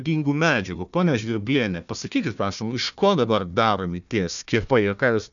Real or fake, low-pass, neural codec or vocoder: fake; 7.2 kHz; codec, 16 kHz, 1 kbps, FunCodec, trained on Chinese and English, 50 frames a second